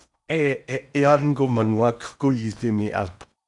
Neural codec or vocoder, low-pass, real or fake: codec, 16 kHz in and 24 kHz out, 0.8 kbps, FocalCodec, streaming, 65536 codes; 10.8 kHz; fake